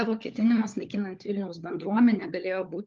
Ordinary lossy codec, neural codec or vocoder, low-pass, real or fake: Opus, 32 kbps; codec, 16 kHz, 4 kbps, FunCodec, trained on LibriTTS, 50 frames a second; 7.2 kHz; fake